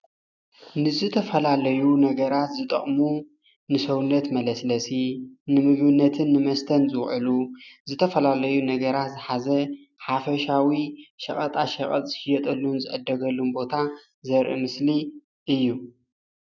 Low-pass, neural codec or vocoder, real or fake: 7.2 kHz; none; real